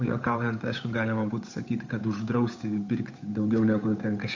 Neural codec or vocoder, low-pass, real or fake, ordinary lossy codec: codec, 16 kHz, 8 kbps, FunCodec, trained on Chinese and English, 25 frames a second; 7.2 kHz; fake; AAC, 48 kbps